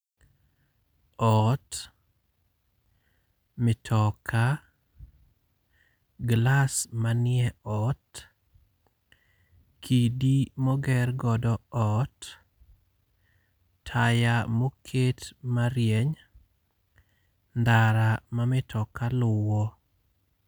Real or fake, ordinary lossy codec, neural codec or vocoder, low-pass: real; none; none; none